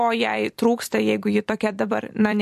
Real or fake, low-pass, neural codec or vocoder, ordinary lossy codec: fake; 14.4 kHz; vocoder, 44.1 kHz, 128 mel bands every 256 samples, BigVGAN v2; MP3, 64 kbps